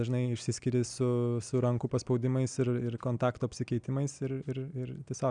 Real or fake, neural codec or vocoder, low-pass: real; none; 9.9 kHz